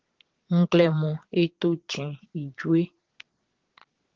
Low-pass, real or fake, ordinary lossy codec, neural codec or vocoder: 7.2 kHz; fake; Opus, 16 kbps; vocoder, 22.05 kHz, 80 mel bands, Vocos